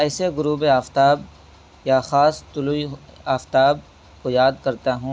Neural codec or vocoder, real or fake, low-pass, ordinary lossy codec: none; real; none; none